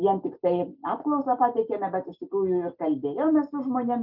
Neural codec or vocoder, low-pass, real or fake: none; 5.4 kHz; real